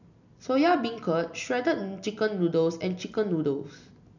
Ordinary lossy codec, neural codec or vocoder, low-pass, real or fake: none; none; 7.2 kHz; real